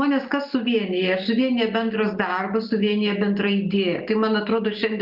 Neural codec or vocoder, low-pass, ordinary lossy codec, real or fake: none; 5.4 kHz; Opus, 24 kbps; real